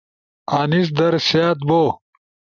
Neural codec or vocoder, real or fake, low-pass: none; real; 7.2 kHz